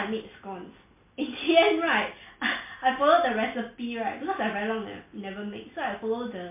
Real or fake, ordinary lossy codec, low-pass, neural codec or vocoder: real; MP3, 24 kbps; 3.6 kHz; none